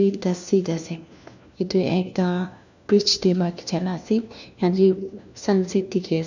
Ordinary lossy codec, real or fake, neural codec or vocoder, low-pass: none; fake; codec, 16 kHz, 1 kbps, FunCodec, trained on LibriTTS, 50 frames a second; 7.2 kHz